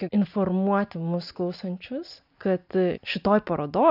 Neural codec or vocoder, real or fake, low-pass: none; real; 5.4 kHz